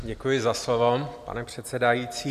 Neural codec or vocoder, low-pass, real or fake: none; 14.4 kHz; real